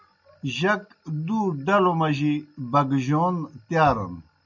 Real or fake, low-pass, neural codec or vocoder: real; 7.2 kHz; none